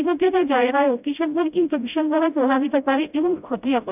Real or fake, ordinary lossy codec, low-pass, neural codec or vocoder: fake; AAC, 32 kbps; 3.6 kHz; codec, 16 kHz, 0.5 kbps, FreqCodec, smaller model